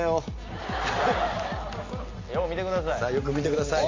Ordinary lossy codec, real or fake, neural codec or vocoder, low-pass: AAC, 32 kbps; real; none; 7.2 kHz